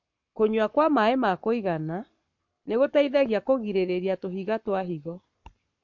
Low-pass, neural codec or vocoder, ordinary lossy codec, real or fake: 7.2 kHz; codec, 44.1 kHz, 7.8 kbps, Pupu-Codec; MP3, 48 kbps; fake